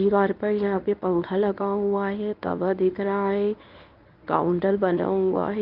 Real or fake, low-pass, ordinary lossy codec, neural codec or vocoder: fake; 5.4 kHz; Opus, 24 kbps; codec, 24 kHz, 0.9 kbps, WavTokenizer, medium speech release version 1